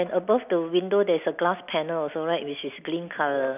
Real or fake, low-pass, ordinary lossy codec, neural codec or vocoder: real; 3.6 kHz; none; none